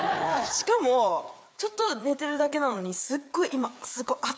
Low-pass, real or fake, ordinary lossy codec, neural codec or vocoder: none; fake; none; codec, 16 kHz, 4 kbps, FreqCodec, larger model